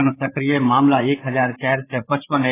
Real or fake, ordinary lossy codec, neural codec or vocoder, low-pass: fake; AAC, 16 kbps; codec, 16 kHz, 8 kbps, FreqCodec, larger model; 3.6 kHz